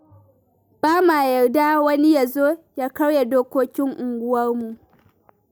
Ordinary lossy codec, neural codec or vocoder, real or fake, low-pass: none; none; real; none